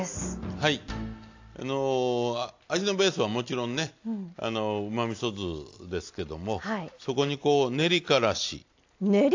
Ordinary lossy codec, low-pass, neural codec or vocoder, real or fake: AAC, 48 kbps; 7.2 kHz; none; real